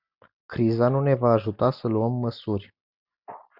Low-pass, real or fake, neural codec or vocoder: 5.4 kHz; real; none